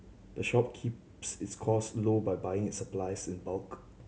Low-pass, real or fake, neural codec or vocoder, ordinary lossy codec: none; real; none; none